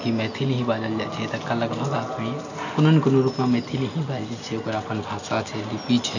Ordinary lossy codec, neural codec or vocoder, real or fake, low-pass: none; none; real; 7.2 kHz